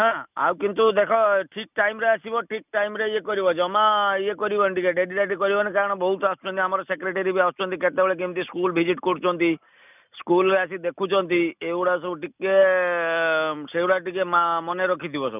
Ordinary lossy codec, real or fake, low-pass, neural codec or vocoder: none; real; 3.6 kHz; none